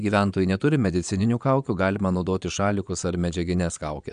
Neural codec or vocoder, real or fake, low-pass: vocoder, 22.05 kHz, 80 mel bands, Vocos; fake; 9.9 kHz